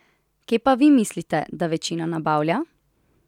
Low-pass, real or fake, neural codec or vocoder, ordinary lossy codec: 19.8 kHz; real; none; none